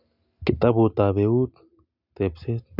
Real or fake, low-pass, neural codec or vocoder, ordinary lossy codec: real; 5.4 kHz; none; none